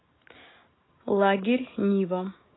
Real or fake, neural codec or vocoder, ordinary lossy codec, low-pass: real; none; AAC, 16 kbps; 7.2 kHz